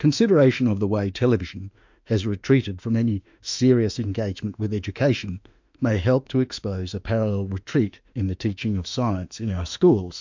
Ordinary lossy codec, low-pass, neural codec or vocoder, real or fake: MP3, 64 kbps; 7.2 kHz; autoencoder, 48 kHz, 32 numbers a frame, DAC-VAE, trained on Japanese speech; fake